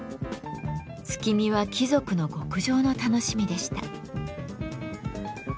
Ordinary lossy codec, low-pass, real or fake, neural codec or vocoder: none; none; real; none